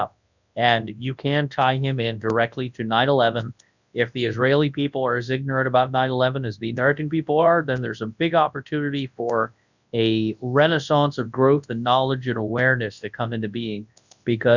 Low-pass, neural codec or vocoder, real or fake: 7.2 kHz; codec, 24 kHz, 0.9 kbps, WavTokenizer, large speech release; fake